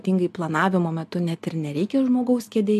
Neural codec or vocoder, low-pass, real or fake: none; 14.4 kHz; real